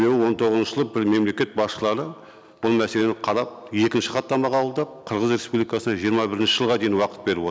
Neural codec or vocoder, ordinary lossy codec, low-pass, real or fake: none; none; none; real